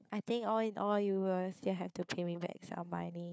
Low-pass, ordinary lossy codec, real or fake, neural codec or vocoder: none; none; fake; codec, 16 kHz, 4 kbps, FunCodec, trained on Chinese and English, 50 frames a second